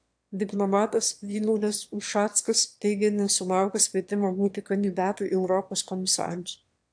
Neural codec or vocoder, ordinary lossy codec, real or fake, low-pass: autoencoder, 22.05 kHz, a latent of 192 numbers a frame, VITS, trained on one speaker; AAC, 64 kbps; fake; 9.9 kHz